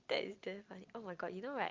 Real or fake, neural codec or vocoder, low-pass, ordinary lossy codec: real; none; 7.2 kHz; Opus, 24 kbps